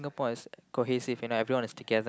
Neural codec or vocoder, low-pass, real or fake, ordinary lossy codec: none; none; real; none